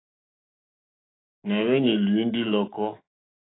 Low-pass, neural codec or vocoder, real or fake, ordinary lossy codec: 7.2 kHz; autoencoder, 48 kHz, 128 numbers a frame, DAC-VAE, trained on Japanese speech; fake; AAC, 16 kbps